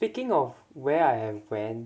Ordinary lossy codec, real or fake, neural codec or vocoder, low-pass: none; real; none; none